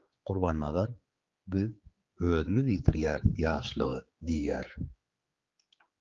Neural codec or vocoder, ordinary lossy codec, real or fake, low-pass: codec, 16 kHz, 4 kbps, X-Codec, HuBERT features, trained on general audio; Opus, 32 kbps; fake; 7.2 kHz